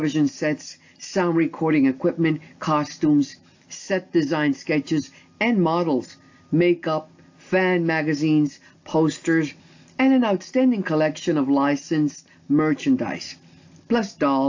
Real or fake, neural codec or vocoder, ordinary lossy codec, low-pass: real; none; AAC, 48 kbps; 7.2 kHz